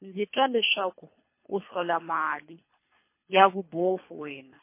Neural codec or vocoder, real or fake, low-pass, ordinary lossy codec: codec, 24 kHz, 3 kbps, HILCodec; fake; 3.6 kHz; MP3, 24 kbps